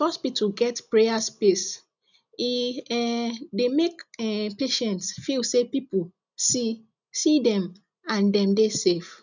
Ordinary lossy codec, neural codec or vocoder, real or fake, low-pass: none; none; real; 7.2 kHz